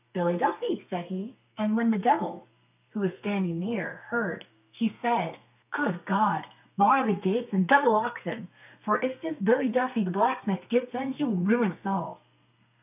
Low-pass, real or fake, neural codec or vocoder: 3.6 kHz; fake; codec, 32 kHz, 1.9 kbps, SNAC